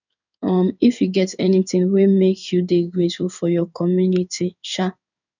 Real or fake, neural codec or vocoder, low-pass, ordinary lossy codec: fake; codec, 16 kHz in and 24 kHz out, 1 kbps, XY-Tokenizer; 7.2 kHz; none